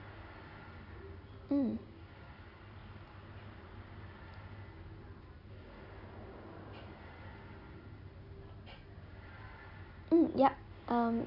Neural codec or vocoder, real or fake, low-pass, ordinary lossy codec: none; real; 5.4 kHz; none